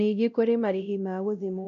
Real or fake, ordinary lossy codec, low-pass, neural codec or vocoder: fake; none; 7.2 kHz; codec, 16 kHz, 0.5 kbps, X-Codec, WavLM features, trained on Multilingual LibriSpeech